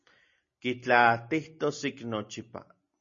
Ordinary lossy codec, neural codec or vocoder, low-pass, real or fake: MP3, 32 kbps; none; 7.2 kHz; real